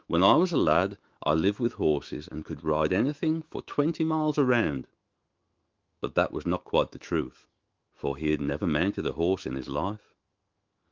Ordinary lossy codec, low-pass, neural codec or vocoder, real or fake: Opus, 32 kbps; 7.2 kHz; autoencoder, 48 kHz, 128 numbers a frame, DAC-VAE, trained on Japanese speech; fake